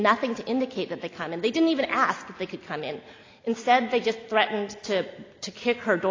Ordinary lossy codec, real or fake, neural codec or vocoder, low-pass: AAC, 32 kbps; real; none; 7.2 kHz